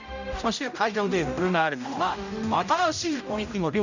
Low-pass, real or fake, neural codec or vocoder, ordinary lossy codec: 7.2 kHz; fake; codec, 16 kHz, 0.5 kbps, X-Codec, HuBERT features, trained on general audio; none